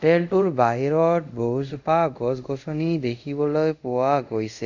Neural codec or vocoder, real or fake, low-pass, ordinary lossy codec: codec, 24 kHz, 0.5 kbps, DualCodec; fake; 7.2 kHz; none